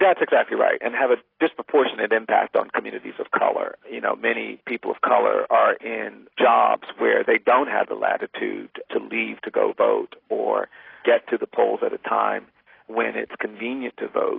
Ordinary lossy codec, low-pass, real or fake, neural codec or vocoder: AAC, 24 kbps; 5.4 kHz; real; none